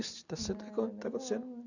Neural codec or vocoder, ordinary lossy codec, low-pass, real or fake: none; none; 7.2 kHz; real